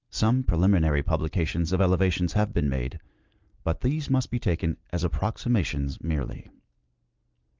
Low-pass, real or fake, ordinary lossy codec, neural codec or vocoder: 7.2 kHz; real; Opus, 32 kbps; none